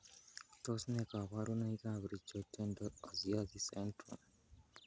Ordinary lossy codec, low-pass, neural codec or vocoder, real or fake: none; none; none; real